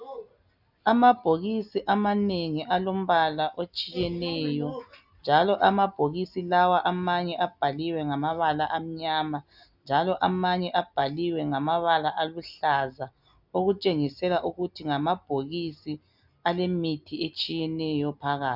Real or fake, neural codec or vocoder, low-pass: real; none; 5.4 kHz